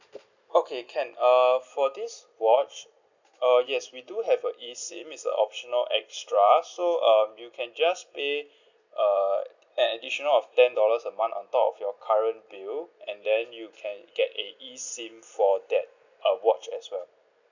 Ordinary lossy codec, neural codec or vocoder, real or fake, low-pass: none; none; real; 7.2 kHz